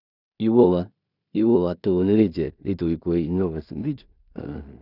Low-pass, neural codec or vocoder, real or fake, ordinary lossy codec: 5.4 kHz; codec, 16 kHz in and 24 kHz out, 0.4 kbps, LongCat-Audio-Codec, two codebook decoder; fake; none